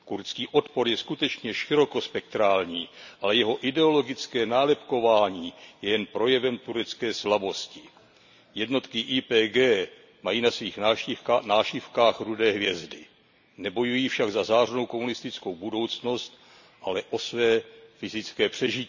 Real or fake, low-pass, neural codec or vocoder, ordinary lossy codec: real; 7.2 kHz; none; none